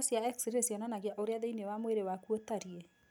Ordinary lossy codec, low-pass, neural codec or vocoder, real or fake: none; none; none; real